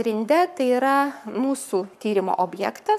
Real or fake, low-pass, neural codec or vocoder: fake; 14.4 kHz; codec, 44.1 kHz, 7.8 kbps, Pupu-Codec